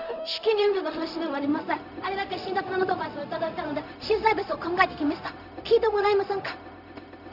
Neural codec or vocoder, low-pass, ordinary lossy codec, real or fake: codec, 16 kHz, 0.4 kbps, LongCat-Audio-Codec; 5.4 kHz; none; fake